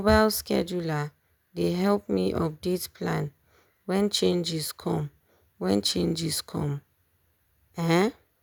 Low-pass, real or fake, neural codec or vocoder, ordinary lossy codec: none; real; none; none